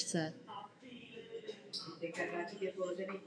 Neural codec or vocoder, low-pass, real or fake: autoencoder, 48 kHz, 128 numbers a frame, DAC-VAE, trained on Japanese speech; 9.9 kHz; fake